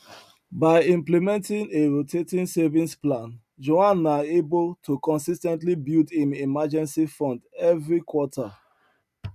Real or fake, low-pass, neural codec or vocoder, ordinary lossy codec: real; 14.4 kHz; none; none